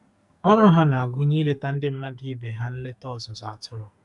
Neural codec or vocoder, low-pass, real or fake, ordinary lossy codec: codec, 44.1 kHz, 2.6 kbps, SNAC; 10.8 kHz; fake; none